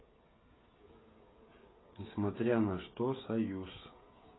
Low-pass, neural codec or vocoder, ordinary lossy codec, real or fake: 7.2 kHz; codec, 16 kHz, 16 kbps, FreqCodec, smaller model; AAC, 16 kbps; fake